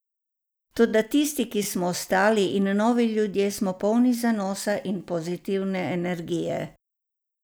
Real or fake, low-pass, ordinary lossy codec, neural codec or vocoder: real; none; none; none